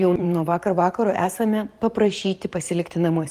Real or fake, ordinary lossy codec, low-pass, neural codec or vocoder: fake; Opus, 24 kbps; 14.4 kHz; vocoder, 44.1 kHz, 128 mel bands every 512 samples, BigVGAN v2